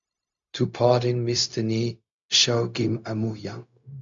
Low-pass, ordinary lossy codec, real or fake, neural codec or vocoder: 7.2 kHz; AAC, 64 kbps; fake; codec, 16 kHz, 0.4 kbps, LongCat-Audio-Codec